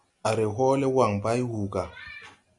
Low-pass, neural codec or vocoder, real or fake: 10.8 kHz; none; real